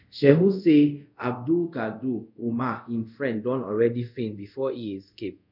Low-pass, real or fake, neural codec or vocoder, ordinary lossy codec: 5.4 kHz; fake; codec, 24 kHz, 0.5 kbps, DualCodec; none